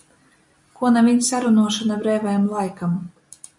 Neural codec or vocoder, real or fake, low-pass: none; real; 10.8 kHz